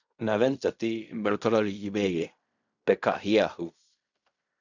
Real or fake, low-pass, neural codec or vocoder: fake; 7.2 kHz; codec, 16 kHz in and 24 kHz out, 0.4 kbps, LongCat-Audio-Codec, fine tuned four codebook decoder